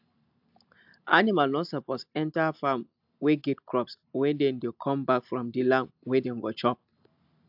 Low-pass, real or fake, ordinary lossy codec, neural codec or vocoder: 5.4 kHz; real; AAC, 48 kbps; none